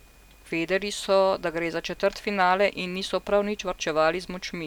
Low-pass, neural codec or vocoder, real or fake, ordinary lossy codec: 19.8 kHz; none; real; none